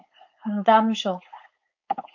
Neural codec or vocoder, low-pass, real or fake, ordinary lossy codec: codec, 16 kHz, 4.8 kbps, FACodec; 7.2 kHz; fake; MP3, 48 kbps